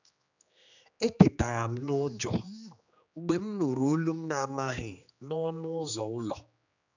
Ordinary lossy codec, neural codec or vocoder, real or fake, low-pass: none; codec, 16 kHz, 2 kbps, X-Codec, HuBERT features, trained on general audio; fake; 7.2 kHz